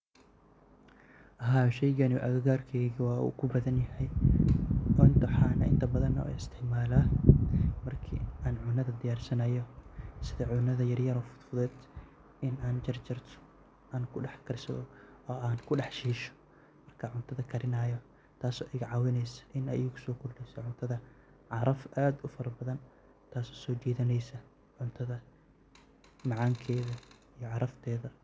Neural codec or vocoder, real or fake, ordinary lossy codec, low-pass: none; real; none; none